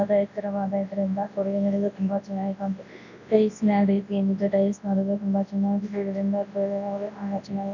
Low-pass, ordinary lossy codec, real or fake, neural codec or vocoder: 7.2 kHz; none; fake; codec, 24 kHz, 0.9 kbps, WavTokenizer, large speech release